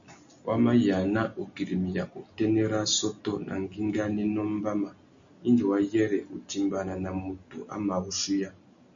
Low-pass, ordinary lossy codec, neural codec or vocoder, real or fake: 7.2 kHz; MP3, 64 kbps; none; real